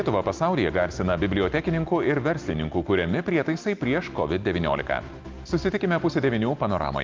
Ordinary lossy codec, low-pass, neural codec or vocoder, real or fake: Opus, 32 kbps; 7.2 kHz; none; real